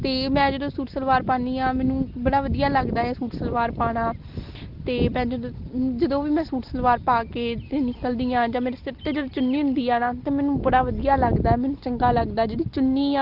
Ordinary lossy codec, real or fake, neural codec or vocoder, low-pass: Opus, 16 kbps; real; none; 5.4 kHz